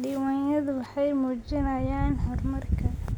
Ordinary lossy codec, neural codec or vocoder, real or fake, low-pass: none; none; real; none